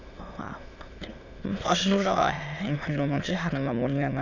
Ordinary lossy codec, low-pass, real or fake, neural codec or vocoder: none; 7.2 kHz; fake; autoencoder, 22.05 kHz, a latent of 192 numbers a frame, VITS, trained on many speakers